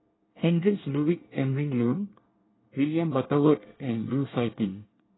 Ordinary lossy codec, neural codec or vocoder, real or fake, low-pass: AAC, 16 kbps; codec, 24 kHz, 1 kbps, SNAC; fake; 7.2 kHz